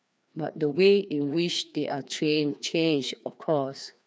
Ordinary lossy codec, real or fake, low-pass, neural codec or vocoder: none; fake; none; codec, 16 kHz, 2 kbps, FreqCodec, larger model